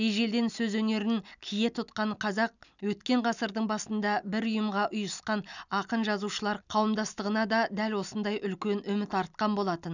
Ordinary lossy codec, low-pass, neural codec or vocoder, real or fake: none; 7.2 kHz; none; real